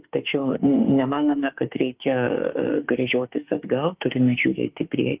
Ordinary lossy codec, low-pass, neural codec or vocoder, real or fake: Opus, 24 kbps; 3.6 kHz; autoencoder, 48 kHz, 32 numbers a frame, DAC-VAE, trained on Japanese speech; fake